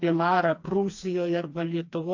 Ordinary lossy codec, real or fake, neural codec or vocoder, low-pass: AAC, 48 kbps; fake; codec, 16 kHz, 2 kbps, FreqCodec, smaller model; 7.2 kHz